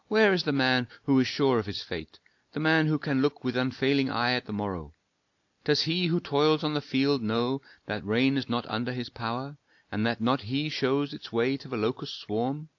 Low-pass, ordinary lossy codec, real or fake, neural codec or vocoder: 7.2 kHz; AAC, 48 kbps; real; none